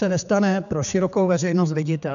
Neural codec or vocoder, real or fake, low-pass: codec, 16 kHz, 4 kbps, X-Codec, HuBERT features, trained on general audio; fake; 7.2 kHz